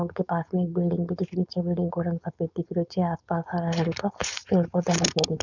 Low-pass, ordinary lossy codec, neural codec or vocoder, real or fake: 7.2 kHz; none; codec, 16 kHz, 4.8 kbps, FACodec; fake